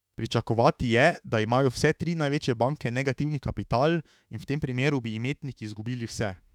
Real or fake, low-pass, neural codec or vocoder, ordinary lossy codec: fake; 19.8 kHz; autoencoder, 48 kHz, 32 numbers a frame, DAC-VAE, trained on Japanese speech; none